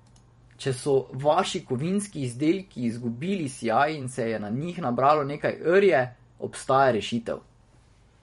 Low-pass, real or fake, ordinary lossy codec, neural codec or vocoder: 19.8 kHz; fake; MP3, 48 kbps; vocoder, 44.1 kHz, 128 mel bands every 512 samples, BigVGAN v2